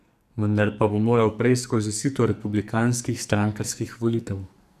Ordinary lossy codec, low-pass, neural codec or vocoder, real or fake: none; 14.4 kHz; codec, 44.1 kHz, 2.6 kbps, SNAC; fake